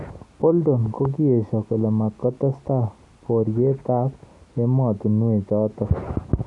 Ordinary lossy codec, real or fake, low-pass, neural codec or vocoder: none; real; 10.8 kHz; none